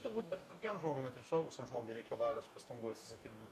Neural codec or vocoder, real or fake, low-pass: codec, 44.1 kHz, 2.6 kbps, DAC; fake; 14.4 kHz